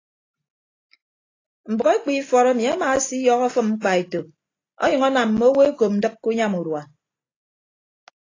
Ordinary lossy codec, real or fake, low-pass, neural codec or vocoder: AAC, 32 kbps; real; 7.2 kHz; none